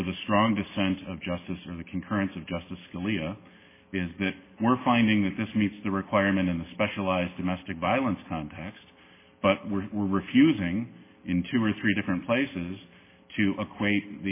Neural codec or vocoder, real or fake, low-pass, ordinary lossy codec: none; real; 3.6 kHz; MP3, 16 kbps